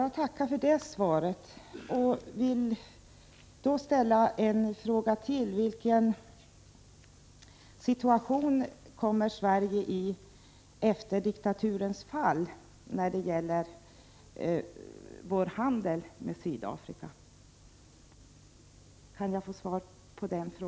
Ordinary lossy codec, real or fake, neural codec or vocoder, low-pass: none; real; none; none